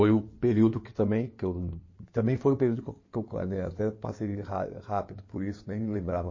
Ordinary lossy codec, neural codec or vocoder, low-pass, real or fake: MP3, 32 kbps; codec, 16 kHz in and 24 kHz out, 2.2 kbps, FireRedTTS-2 codec; 7.2 kHz; fake